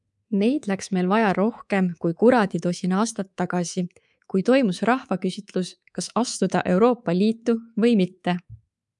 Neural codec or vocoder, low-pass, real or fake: codec, 24 kHz, 3.1 kbps, DualCodec; 10.8 kHz; fake